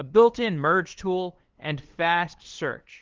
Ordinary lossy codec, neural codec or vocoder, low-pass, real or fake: Opus, 16 kbps; codec, 16 kHz, 2 kbps, FunCodec, trained on LibriTTS, 25 frames a second; 7.2 kHz; fake